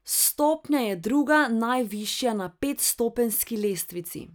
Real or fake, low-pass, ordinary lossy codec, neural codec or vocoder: real; none; none; none